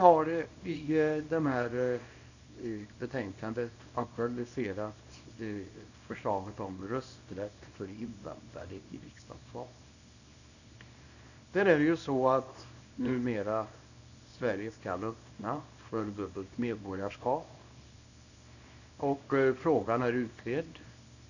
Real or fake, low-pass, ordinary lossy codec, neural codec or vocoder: fake; 7.2 kHz; none; codec, 24 kHz, 0.9 kbps, WavTokenizer, small release